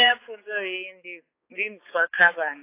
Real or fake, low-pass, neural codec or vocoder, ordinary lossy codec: fake; 3.6 kHz; codec, 16 kHz, 4 kbps, X-Codec, HuBERT features, trained on balanced general audio; AAC, 24 kbps